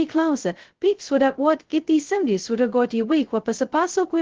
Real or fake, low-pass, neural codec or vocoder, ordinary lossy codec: fake; 7.2 kHz; codec, 16 kHz, 0.2 kbps, FocalCodec; Opus, 16 kbps